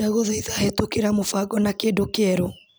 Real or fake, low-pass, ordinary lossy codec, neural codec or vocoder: real; none; none; none